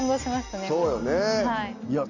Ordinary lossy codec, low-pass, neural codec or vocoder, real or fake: none; 7.2 kHz; none; real